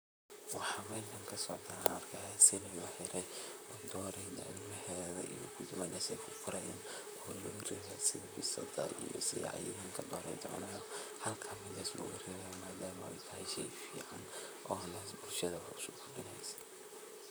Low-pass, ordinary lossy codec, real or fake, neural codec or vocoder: none; none; fake; vocoder, 44.1 kHz, 128 mel bands, Pupu-Vocoder